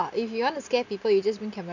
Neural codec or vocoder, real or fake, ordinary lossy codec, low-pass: none; real; none; 7.2 kHz